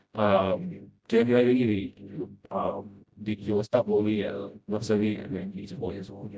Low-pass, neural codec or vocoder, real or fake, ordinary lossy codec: none; codec, 16 kHz, 0.5 kbps, FreqCodec, smaller model; fake; none